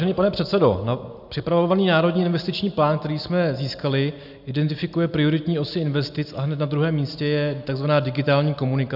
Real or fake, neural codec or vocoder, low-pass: real; none; 5.4 kHz